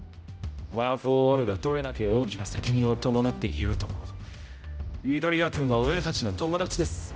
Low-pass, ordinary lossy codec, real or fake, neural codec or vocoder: none; none; fake; codec, 16 kHz, 0.5 kbps, X-Codec, HuBERT features, trained on general audio